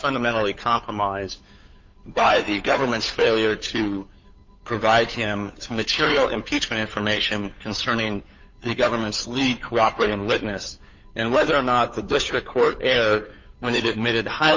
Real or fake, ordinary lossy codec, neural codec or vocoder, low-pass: fake; MP3, 48 kbps; codec, 16 kHz, 2 kbps, FunCodec, trained on Chinese and English, 25 frames a second; 7.2 kHz